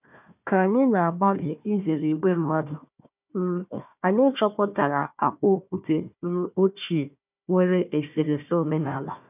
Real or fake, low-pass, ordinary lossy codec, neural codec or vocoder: fake; 3.6 kHz; none; codec, 16 kHz, 1 kbps, FunCodec, trained on Chinese and English, 50 frames a second